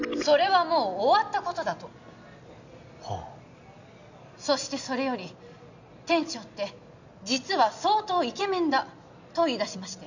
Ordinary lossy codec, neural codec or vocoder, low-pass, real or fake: none; none; 7.2 kHz; real